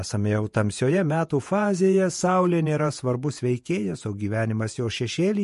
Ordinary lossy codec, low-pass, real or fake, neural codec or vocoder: MP3, 48 kbps; 14.4 kHz; fake; vocoder, 48 kHz, 128 mel bands, Vocos